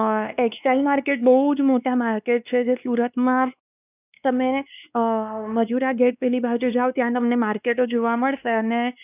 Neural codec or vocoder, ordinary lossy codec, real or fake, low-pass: codec, 16 kHz, 2 kbps, X-Codec, WavLM features, trained on Multilingual LibriSpeech; none; fake; 3.6 kHz